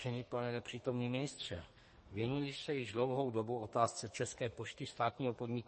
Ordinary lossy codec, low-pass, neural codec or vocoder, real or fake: MP3, 32 kbps; 10.8 kHz; codec, 32 kHz, 1.9 kbps, SNAC; fake